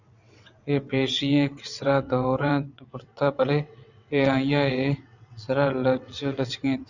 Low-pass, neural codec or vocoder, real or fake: 7.2 kHz; vocoder, 22.05 kHz, 80 mel bands, WaveNeXt; fake